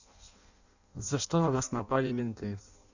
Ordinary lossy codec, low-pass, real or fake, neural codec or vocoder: none; 7.2 kHz; fake; codec, 16 kHz in and 24 kHz out, 0.6 kbps, FireRedTTS-2 codec